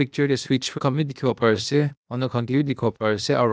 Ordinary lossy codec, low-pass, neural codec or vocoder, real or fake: none; none; codec, 16 kHz, 0.8 kbps, ZipCodec; fake